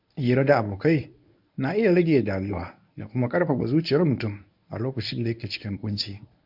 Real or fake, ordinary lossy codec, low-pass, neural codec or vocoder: fake; MP3, 48 kbps; 5.4 kHz; codec, 24 kHz, 0.9 kbps, WavTokenizer, medium speech release version 1